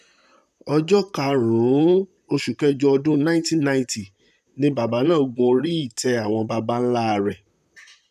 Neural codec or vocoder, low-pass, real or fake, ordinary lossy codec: vocoder, 44.1 kHz, 128 mel bands, Pupu-Vocoder; 14.4 kHz; fake; none